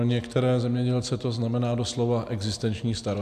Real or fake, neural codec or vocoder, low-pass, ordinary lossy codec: real; none; 14.4 kHz; AAC, 96 kbps